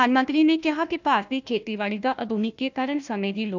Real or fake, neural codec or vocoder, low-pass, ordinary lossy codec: fake; codec, 16 kHz, 1 kbps, FunCodec, trained on Chinese and English, 50 frames a second; 7.2 kHz; none